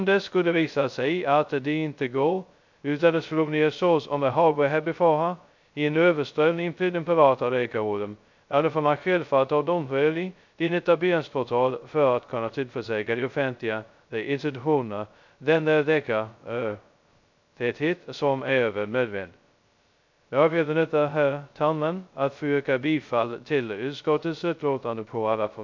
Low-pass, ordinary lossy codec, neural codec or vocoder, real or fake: 7.2 kHz; MP3, 64 kbps; codec, 16 kHz, 0.2 kbps, FocalCodec; fake